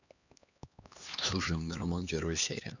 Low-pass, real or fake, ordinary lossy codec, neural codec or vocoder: 7.2 kHz; fake; none; codec, 16 kHz, 2 kbps, X-Codec, HuBERT features, trained on LibriSpeech